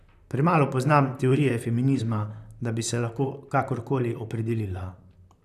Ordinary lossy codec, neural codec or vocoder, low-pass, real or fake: none; vocoder, 44.1 kHz, 128 mel bands, Pupu-Vocoder; 14.4 kHz; fake